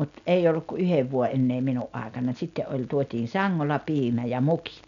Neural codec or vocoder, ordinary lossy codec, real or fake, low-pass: none; none; real; 7.2 kHz